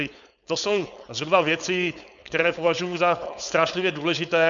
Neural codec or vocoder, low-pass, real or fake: codec, 16 kHz, 4.8 kbps, FACodec; 7.2 kHz; fake